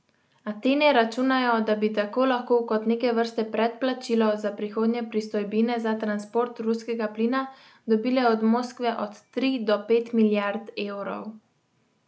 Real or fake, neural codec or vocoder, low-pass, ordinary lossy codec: real; none; none; none